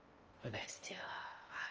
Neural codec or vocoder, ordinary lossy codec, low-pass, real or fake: codec, 16 kHz in and 24 kHz out, 0.8 kbps, FocalCodec, streaming, 65536 codes; Opus, 24 kbps; 7.2 kHz; fake